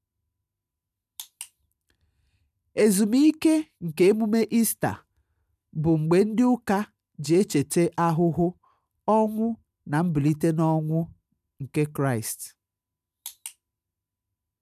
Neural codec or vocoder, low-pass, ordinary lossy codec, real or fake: none; 14.4 kHz; none; real